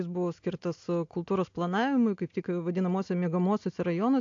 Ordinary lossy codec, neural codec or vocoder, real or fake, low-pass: AAC, 48 kbps; none; real; 7.2 kHz